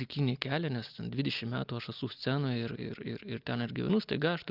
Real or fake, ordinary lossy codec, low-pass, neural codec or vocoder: real; Opus, 24 kbps; 5.4 kHz; none